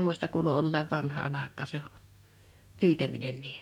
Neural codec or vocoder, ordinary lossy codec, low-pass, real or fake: codec, 44.1 kHz, 2.6 kbps, DAC; none; 19.8 kHz; fake